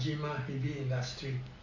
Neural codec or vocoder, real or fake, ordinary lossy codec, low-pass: none; real; Opus, 64 kbps; 7.2 kHz